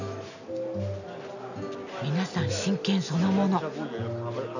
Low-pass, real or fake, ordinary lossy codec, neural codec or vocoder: 7.2 kHz; real; none; none